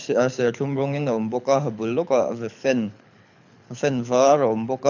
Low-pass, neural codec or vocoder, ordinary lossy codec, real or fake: 7.2 kHz; codec, 24 kHz, 6 kbps, HILCodec; none; fake